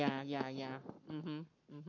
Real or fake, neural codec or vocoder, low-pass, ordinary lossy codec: fake; codec, 44.1 kHz, 7.8 kbps, Pupu-Codec; 7.2 kHz; none